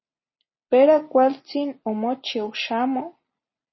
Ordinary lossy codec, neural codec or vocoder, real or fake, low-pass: MP3, 24 kbps; none; real; 7.2 kHz